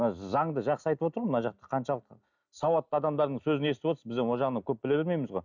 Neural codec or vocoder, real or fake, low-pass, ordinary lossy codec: none; real; 7.2 kHz; none